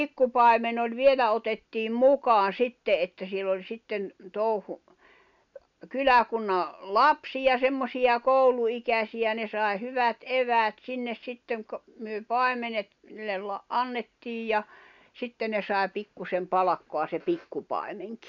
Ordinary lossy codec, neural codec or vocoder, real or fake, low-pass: none; none; real; 7.2 kHz